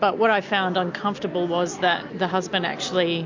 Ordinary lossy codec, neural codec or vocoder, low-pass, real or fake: MP3, 48 kbps; none; 7.2 kHz; real